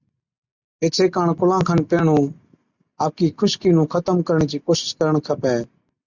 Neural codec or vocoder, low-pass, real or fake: none; 7.2 kHz; real